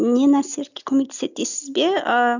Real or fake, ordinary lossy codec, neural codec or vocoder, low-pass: real; none; none; 7.2 kHz